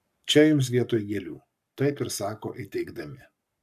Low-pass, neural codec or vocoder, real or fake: 14.4 kHz; codec, 44.1 kHz, 7.8 kbps, Pupu-Codec; fake